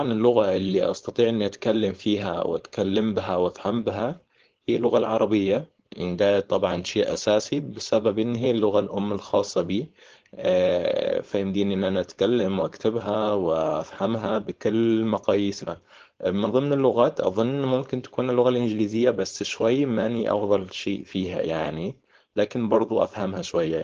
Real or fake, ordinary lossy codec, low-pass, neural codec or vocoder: fake; Opus, 16 kbps; 7.2 kHz; codec, 16 kHz, 4.8 kbps, FACodec